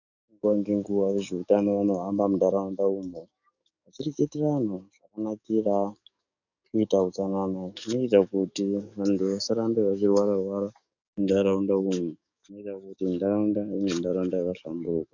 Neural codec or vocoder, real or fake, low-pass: codec, 44.1 kHz, 7.8 kbps, DAC; fake; 7.2 kHz